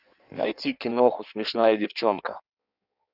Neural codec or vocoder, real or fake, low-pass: codec, 16 kHz in and 24 kHz out, 1.1 kbps, FireRedTTS-2 codec; fake; 5.4 kHz